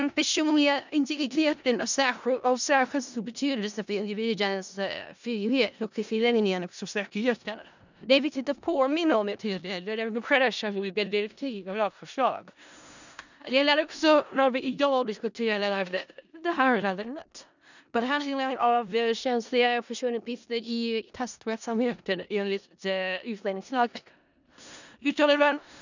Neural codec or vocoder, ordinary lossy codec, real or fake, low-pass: codec, 16 kHz in and 24 kHz out, 0.4 kbps, LongCat-Audio-Codec, four codebook decoder; none; fake; 7.2 kHz